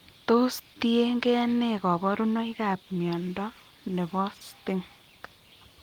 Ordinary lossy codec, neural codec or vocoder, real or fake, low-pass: Opus, 24 kbps; none; real; 19.8 kHz